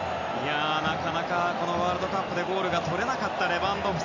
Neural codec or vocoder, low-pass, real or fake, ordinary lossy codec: none; 7.2 kHz; real; none